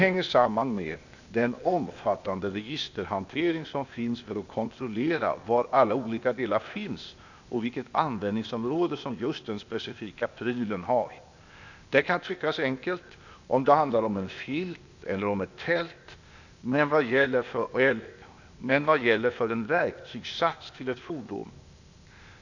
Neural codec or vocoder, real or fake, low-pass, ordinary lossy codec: codec, 16 kHz, 0.8 kbps, ZipCodec; fake; 7.2 kHz; none